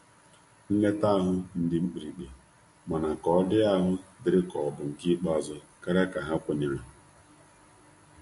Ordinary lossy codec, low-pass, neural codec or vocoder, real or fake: MP3, 48 kbps; 14.4 kHz; none; real